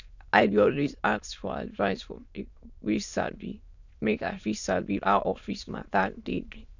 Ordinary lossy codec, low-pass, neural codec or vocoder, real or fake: none; 7.2 kHz; autoencoder, 22.05 kHz, a latent of 192 numbers a frame, VITS, trained on many speakers; fake